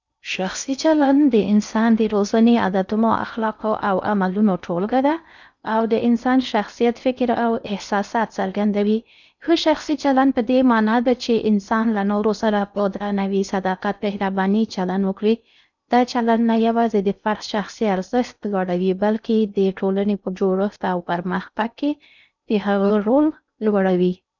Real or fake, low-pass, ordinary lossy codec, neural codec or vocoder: fake; 7.2 kHz; none; codec, 16 kHz in and 24 kHz out, 0.6 kbps, FocalCodec, streaming, 4096 codes